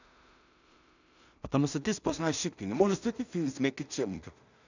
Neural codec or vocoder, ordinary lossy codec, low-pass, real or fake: codec, 16 kHz in and 24 kHz out, 0.4 kbps, LongCat-Audio-Codec, two codebook decoder; none; 7.2 kHz; fake